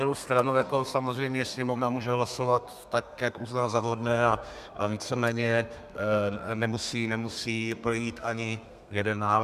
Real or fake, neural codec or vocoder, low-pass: fake; codec, 32 kHz, 1.9 kbps, SNAC; 14.4 kHz